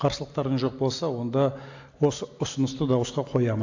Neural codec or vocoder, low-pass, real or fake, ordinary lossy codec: none; 7.2 kHz; real; AAC, 48 kbps